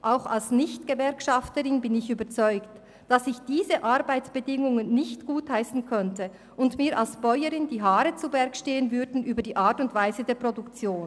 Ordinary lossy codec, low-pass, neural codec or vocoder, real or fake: none; none; none; real